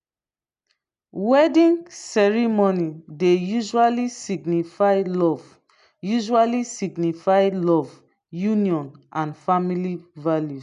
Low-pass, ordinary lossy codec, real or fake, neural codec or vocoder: 10.8 kHz; none; real; none